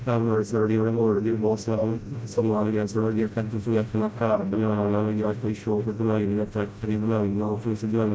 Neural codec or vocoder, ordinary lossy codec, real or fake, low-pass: codec, 16 kHz, 0.5 kbps, FreqCodec, smaller model; none; fake; none